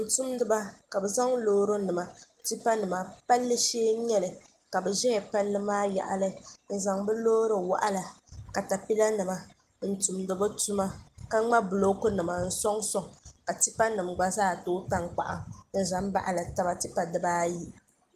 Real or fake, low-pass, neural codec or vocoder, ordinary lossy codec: real; 14.4 kHz; none; Opus, 24 kbps